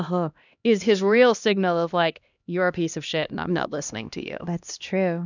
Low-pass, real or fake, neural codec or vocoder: 7.2 kHz; fake; codec, 16 kHz, 1 kbps, X-Codec, HuBERT features, trained on LibriSpeech